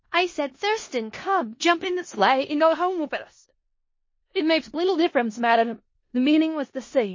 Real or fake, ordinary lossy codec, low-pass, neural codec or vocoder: fake; MP3, 32 kbps; 7.2 kHz; codec, 16 kHz in and 24 kHz out, 0.4 kbps, LongCat-Audio-Codec, four codebook decoder